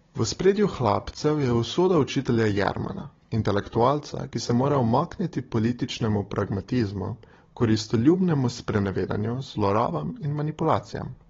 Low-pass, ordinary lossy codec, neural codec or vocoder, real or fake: 7.2 kHz; AAC, 24 kbps; codec, 16 kHz, 16 kbps, FunCodec, trained on Chinese and English, 50 frames a second; fake